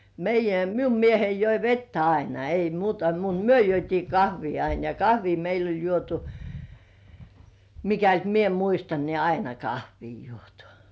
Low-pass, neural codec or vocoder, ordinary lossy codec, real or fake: none; none; none; real